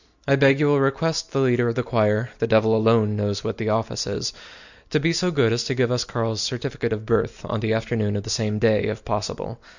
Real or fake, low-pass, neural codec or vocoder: real; 7.2 kHz; none